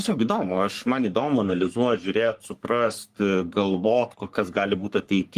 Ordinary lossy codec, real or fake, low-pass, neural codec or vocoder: Opus, 24 kbps; fake; 14.4 kHz; codec, 44.1 kHz, 3.4 kbps, Pupu-Codec